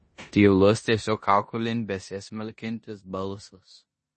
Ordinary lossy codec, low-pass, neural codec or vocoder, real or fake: MP3, 32 kbps; 10.8 kHz; codec, 16 kHz in and 24 kHz out, 0.9 kbps, LongCat-Audio-Codec, four codebook decoder; fake